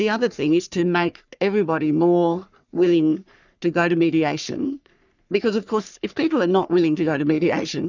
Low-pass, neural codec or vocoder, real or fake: 7.2 kHz; codec, 44.1 kHz, 3.4 kbps, Pupu-Codec; fake